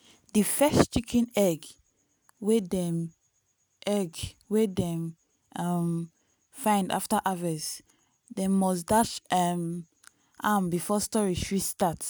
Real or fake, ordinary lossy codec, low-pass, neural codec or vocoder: real; none; none; none